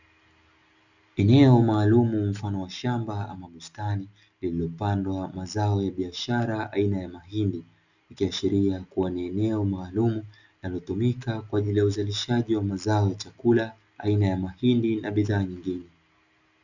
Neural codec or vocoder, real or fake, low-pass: none; real; 7.2 kHz